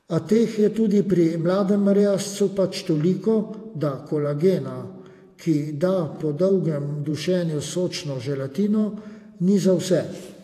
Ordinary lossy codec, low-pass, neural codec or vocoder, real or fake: AAC, 64 kbps; 14.4 kHz; vocoder, 48 kHz, 128 mel bands, Vocos; fake